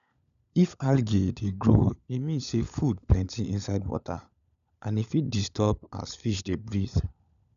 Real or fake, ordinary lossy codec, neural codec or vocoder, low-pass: fake; none; codec, 16 kHz, 16 kbps, FunCodec, trained on LibriTTS, 50 frames a second; 7.2 kHz